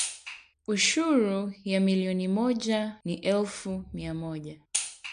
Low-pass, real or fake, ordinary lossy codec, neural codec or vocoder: 9.9 kHz; real; MP3, 64 kbps; none